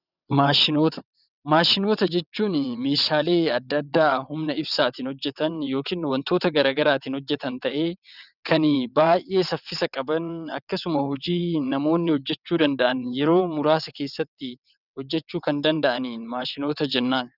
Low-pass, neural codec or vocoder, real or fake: 5.4 kHz; vocoder, 22.05 kHz, 80 mel bands, WaveNeXt; fake